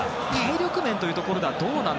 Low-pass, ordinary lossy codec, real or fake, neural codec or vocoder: none; none; real; none